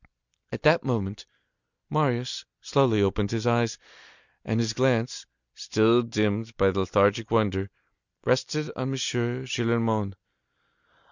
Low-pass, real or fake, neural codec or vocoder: 7.2 kHz; real; none